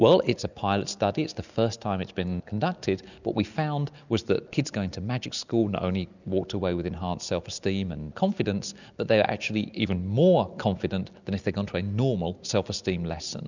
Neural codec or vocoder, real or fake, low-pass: none; real; 7.2 kHz